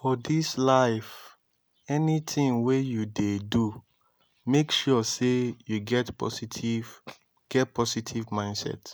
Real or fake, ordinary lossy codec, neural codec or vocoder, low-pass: real; none; none; none